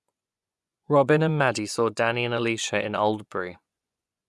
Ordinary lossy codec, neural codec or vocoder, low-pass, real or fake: none; vocoder, 24 kHz, 100 mel bands, Vocos; none; fake